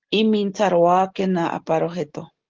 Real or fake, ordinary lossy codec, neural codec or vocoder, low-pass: real; Opus, 16 kbps; none; 7.2 kHz